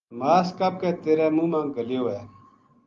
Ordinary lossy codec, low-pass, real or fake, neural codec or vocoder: Opus, 32 kbps; 7.2 kHz; real; none